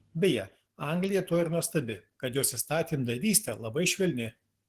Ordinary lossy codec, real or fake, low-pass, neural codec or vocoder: Opus, 16 kbps; fake; 14.4 kHz; codec, 44.1 kHz, 7.8 kbps, DAC